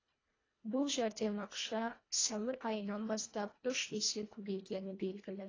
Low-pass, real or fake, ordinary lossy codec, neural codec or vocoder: 7.2 kHz; fake; AAC, 32 kbps; codec, 24 kHz, 1.5 kbps, HILCodec